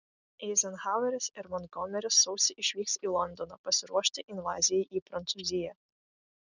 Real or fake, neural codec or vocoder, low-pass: real; none; 7.2 kHz